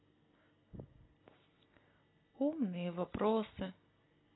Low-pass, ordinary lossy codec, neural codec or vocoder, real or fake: 7.2 kHz; AAC, 16 kbps; none; real